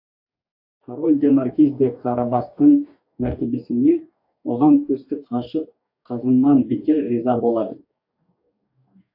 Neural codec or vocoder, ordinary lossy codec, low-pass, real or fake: codec, 44.1 kHz, 2.6 kbps, DAC; AAC, 48 kbps; 5.4 kHz; fake